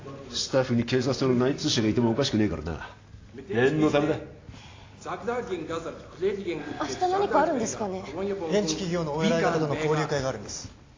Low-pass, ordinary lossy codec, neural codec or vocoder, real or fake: 7.2 kHz; AAC, 32 kbps; none; real